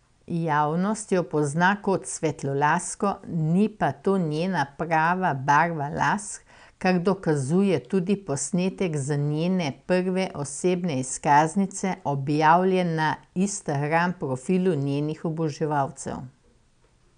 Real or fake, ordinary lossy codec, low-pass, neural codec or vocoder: real; none; 9.9 kHz; none